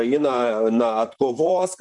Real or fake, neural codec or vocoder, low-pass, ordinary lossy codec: fake; vocoder, 44.1 kHz, 128 mel bands, Pupu-Vocoder; 10.8 kHz; MP3, 96 kbps